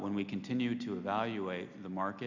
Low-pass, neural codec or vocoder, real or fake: 7.2 kHz; none; real